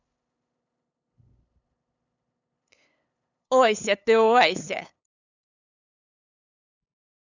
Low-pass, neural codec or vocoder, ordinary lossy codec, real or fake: 7.2 kHz; codec, 16 kHz, 8 kbps, FunCodec, trained on LibriTTS, 25 frames a second; none; fake